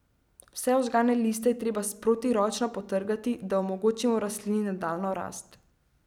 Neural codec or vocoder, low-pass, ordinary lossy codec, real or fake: none; 19.8 kHz; none; real